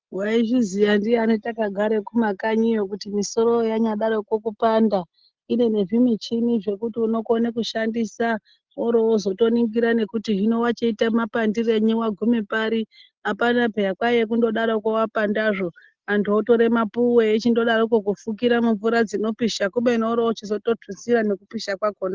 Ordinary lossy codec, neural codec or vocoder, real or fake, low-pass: Opus, 16 kbps; none; real; 7.2 kHz